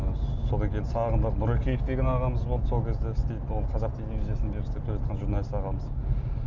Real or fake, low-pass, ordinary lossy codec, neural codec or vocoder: real; 7.2 kHz; none; none